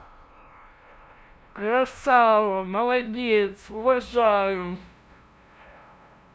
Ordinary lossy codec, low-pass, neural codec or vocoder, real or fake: none; none; codec, 16 kHz, 0.5 kbps, FunCodec, trained on LibriTTS, 25 frames a second; fake